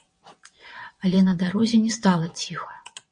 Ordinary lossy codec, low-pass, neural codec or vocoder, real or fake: MP3, 48 kbps; 9.9 kHz; vocoder, 22.05 kHz, 80 mel bands, WaveNeXt; fake